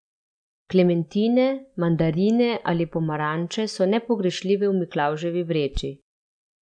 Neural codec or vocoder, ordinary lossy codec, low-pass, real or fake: none; none; 9.9 kHz; real